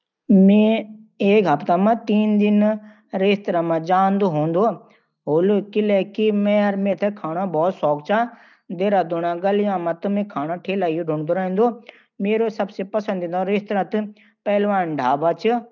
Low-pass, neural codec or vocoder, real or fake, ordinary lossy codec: 7.2 kHz; none; real; none